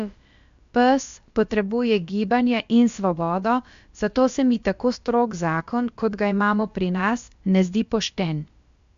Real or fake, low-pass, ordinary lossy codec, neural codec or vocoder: fake; 7.2 kHz; AAC, 64 kbps; codec, 16 kHz, about 1 kbps, DyCAST, with the encoder's durations